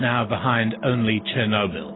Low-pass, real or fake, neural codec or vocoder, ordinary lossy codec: 7.2 kHz; real; none; AAC, 16 kbps